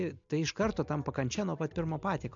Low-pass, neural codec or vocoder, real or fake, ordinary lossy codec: 7.2 kHz; none; real; MP3, 64 kbps